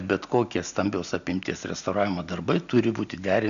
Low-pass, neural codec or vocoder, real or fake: 7.2 kHz; none; real